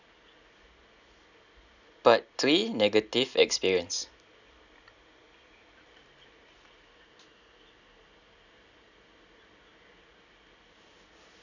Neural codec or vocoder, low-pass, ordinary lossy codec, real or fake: none; 7.2 kHz; none; real